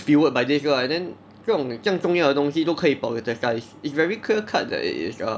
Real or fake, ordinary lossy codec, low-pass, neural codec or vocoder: real; none; none; none